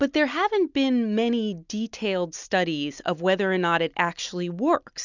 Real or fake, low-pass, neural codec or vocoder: real; 7.2 kHz; none